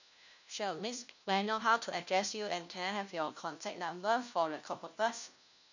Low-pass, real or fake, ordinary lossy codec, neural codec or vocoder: 7.2 kHz; fake; none; codec, 16 kHz, 1 kbps, FunCodec, trained on LibriTTS, 50 frames a second